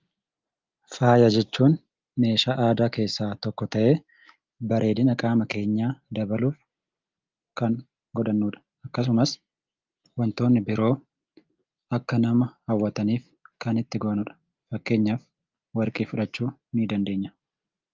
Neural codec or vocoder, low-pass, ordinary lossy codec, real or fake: none; 7.2 kHz; Opus, 32 kbps; real